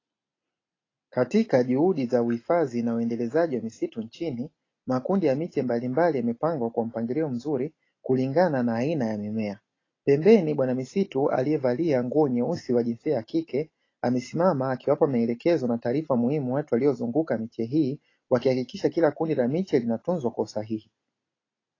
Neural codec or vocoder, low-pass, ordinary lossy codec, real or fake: none; 7.2 kHz; AAC, 32 kbps; real